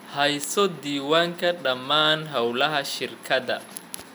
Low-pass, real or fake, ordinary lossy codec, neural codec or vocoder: none; real; none; none